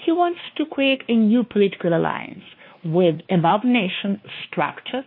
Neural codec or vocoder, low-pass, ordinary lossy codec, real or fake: codec, 16 kHz, 2 kbps, X-Codec, WavLM features, trained on Multilingual LibriSpeech; 5.4 kHz; MP3, 32 kbps; fake